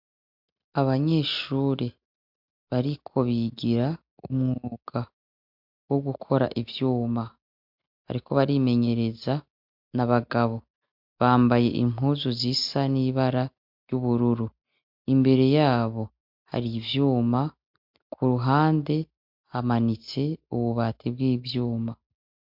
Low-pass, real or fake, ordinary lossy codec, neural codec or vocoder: 5.4 kHz; real; MP3, 48 kbps; none